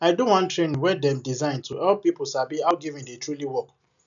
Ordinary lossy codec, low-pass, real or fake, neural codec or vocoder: none; 7.2 kHz; real; none